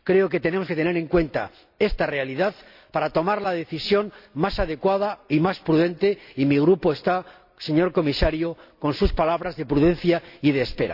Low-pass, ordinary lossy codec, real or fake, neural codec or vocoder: 5.4 kHz; AAC, 48 kbps; real; none